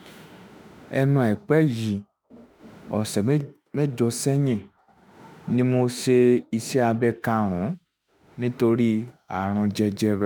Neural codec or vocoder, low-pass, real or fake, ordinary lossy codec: autoencoder, 48 kHz, 32 numbers a frame, DAC-VAE, trained on Japanese speech; none; fake; none